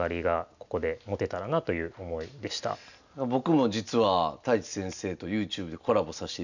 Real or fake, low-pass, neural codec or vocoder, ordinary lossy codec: real; 7.2 kHz; none; none